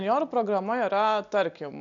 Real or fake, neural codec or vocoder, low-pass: real; none; 7.2 kHz